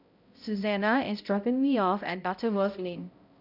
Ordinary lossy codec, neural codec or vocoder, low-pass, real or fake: none; codec, 16 kHz, 0.5 kbps, X-Codec, HuBERT features, trained on balanced general audio; 5.4 kHz; fake